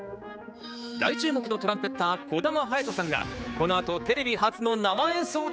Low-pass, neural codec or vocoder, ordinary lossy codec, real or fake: none; codec, 16 kHz, 4 kbps, X-Codec, HuBERT features, trained on general audio; none; fake